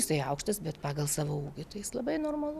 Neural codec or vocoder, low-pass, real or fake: none; 14.4 kHz; real